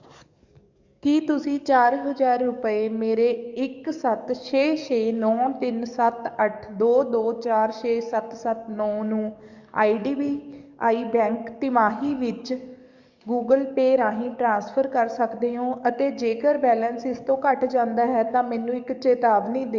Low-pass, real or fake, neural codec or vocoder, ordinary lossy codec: 7.2 kHz; fake; codec, 44.1 kHz, 7.8 kbps, DAC; Opus, 64 kbps